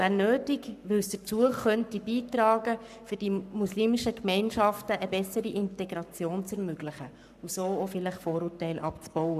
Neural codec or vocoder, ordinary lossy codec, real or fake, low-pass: codec, 44.1 kHz, 7.8 kbps, Pupu-Codec; none; fake; 14.4 kHz